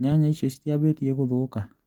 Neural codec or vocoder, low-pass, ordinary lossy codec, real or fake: none; 19.8 kHz; Opus, 24 kbps; real